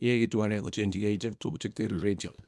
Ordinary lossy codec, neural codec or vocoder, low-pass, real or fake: none; codec, 24 kHz, 0.9 kbps, WavTokenizer, small release; none; fake